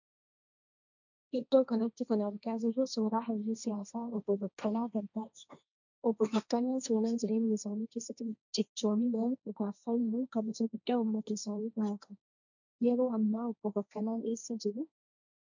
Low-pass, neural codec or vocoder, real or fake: 7.2 kHz; codec, 16 kHz, 1.1 kbps, Voila-Tokenizer; fake